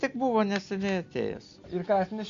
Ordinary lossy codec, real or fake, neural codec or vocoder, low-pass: Opus, 64 kbps; real; none; 7.2 kHz